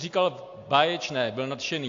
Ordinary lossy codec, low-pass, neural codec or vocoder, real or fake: AAC, 48 kbps; 7.2 kHz; none; real